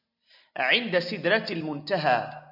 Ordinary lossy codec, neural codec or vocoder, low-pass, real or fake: AAC, 48 kbps; none; 5.4 kHz; real